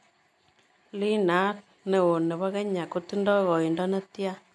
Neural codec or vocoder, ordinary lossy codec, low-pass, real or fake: none; none; none; real